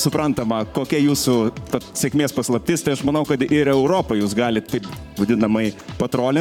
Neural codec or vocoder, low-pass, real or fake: codec, 44.1 kHz, 7.8 kbps, Pupu-Codec; 19.8 kHz; fake